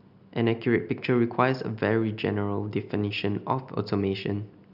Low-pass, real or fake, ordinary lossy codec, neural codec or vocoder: 5.4 kHz; real; none; none